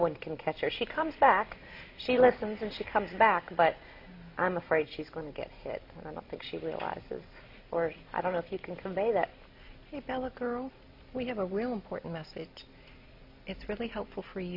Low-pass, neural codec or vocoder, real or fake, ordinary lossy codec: 5.4 kHz; none; real; MP3, 32 kbps